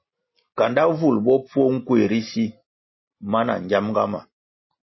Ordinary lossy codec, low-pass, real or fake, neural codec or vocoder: MP3, 24 kbps; 7.2 kHz; real; none